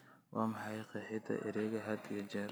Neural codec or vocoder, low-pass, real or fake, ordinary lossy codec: none; none; real; none